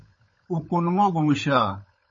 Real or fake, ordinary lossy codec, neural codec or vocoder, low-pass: fake; MP3, 32 kbps; codec, 16 kHz, 16 kbps, FunCodec, trained on LibriTTS, 50 frames a second; 7.2 kHz